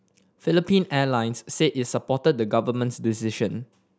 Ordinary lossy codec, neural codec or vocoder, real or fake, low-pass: none; none; real; none